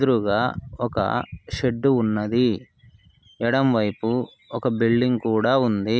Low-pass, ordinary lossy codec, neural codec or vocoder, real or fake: none; none; none; real